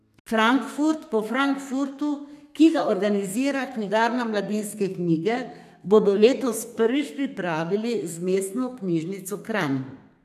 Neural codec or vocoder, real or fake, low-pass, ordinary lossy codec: codec, 32 kHz, 1.9 kbps, SNAC; fake; 14.4 kHz; none